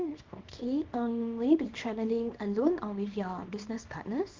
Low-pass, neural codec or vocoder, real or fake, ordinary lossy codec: 7.2 kHz; codec, 24 kHz, 0.9 kbps, WavTokenizer, small release; fake; Opus, 32 kbps